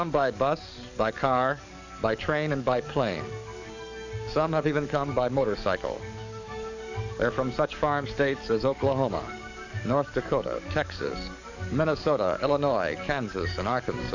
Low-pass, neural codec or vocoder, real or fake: 7.2 kHz; codec, 44.1 kHz, 7.8 kbps, DAC; fake